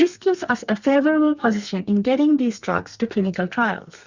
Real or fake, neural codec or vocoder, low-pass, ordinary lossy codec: fake; codec, 32 kHz, 1.9 kbps, SNAC; 7.2 kHz; Opus, 64 kbps